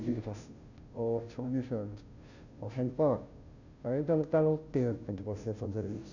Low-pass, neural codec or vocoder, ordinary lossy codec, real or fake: 7.2 kHz; codec, 16 kHz, 0.5 kbps, FunCodec, trained on Chinese and English, 25 frames a second; none; fake